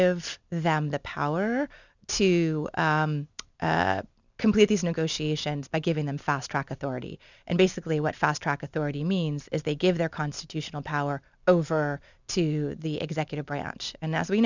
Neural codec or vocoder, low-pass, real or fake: none; 7.2 kHz; real